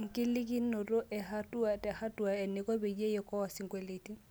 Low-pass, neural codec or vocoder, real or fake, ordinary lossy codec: none; none; real; none